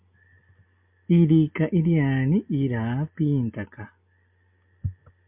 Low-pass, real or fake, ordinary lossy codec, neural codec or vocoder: 3.6 kHz; real; MP3, 32 kbps; none